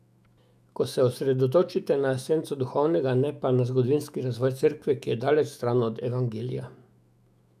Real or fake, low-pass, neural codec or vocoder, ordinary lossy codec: fake; 14.4 kHz; autoencoder, 48 kHz, 128 numbers a frame, DAC-VAE, trained on Japanese speech; none